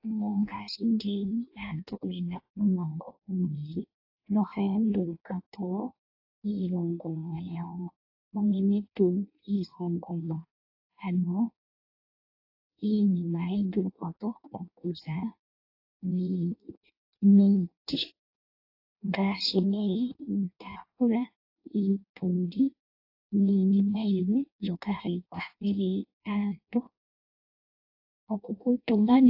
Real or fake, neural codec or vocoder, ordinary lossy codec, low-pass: fake; codec, 16 kHz in and 24 kHz out, 0.6 kbps, FireRedTTS-2 codec; AAC, 32 kbps; 5.4 kHz